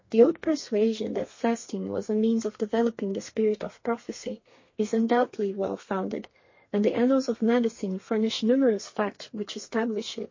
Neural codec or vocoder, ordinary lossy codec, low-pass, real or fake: codec, 32 kHz, 1.9 kbps, SNAC; MP3, 32 kbps; 7.2 kHz; fake